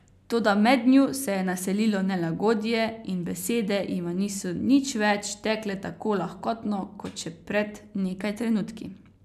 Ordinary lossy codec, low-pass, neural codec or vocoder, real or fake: none; 14.4 kHz; none; real